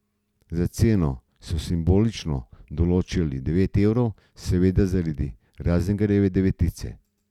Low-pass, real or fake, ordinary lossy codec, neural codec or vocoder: 19.8 kHz; real; none; none